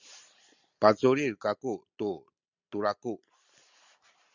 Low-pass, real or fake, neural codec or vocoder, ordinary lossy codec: 7.2 kHz; real; none; Opus, 64 kbps